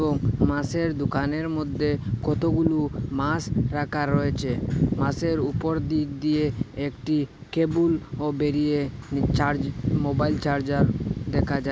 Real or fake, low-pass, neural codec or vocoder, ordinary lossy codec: real; none; none; none